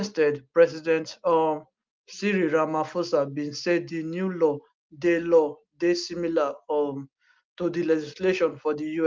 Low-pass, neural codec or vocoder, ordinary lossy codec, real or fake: 7.2 kHz; none; Opus, 32 kbps; real